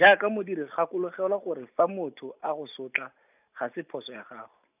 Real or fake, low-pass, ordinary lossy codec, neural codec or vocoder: real; 3.6 kHz; none; none